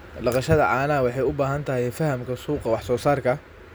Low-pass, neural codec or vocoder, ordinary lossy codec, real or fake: none; none; none; real